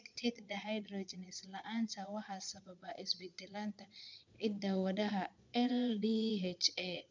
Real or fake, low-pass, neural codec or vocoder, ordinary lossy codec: fake; 7.2 kHz; vocoder, 22.05 kHz, 80 mel bands, Vocos; MP3, 64 kbps